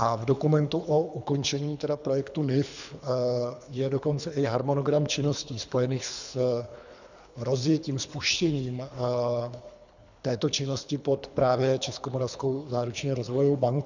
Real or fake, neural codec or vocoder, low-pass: fake; codec, 24 kHz, 3 kbps, HILCodec; 7.2 kHz